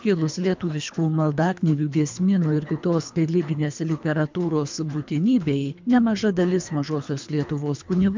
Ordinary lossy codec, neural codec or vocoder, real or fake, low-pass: MP3, 64 kbps; codec, 24 kHz, 3 kbps, HILCodec; fake; 7.2 kHz